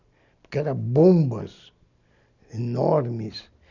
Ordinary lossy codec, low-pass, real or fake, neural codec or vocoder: none; 7.2 kHz; real; none